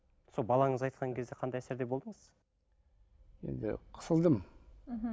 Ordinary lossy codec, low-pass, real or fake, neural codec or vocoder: none; none; real; none